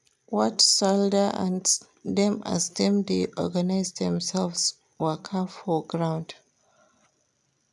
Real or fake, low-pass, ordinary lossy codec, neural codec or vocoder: real; none; none; none